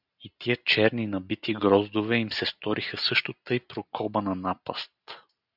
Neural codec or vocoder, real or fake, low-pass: none; real; 5.4 kHz